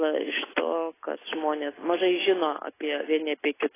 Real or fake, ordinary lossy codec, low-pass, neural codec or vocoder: real; AAC, 16 kbps; 3.6 kHz; none